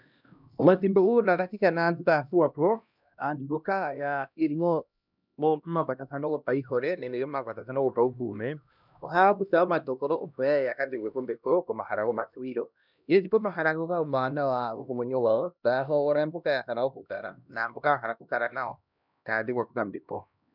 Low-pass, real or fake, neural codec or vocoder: 5.4 kHz; fake; codec, 16 kHz, 1 kbps, X-Codec, HuBERT features, trained on LibriSpeech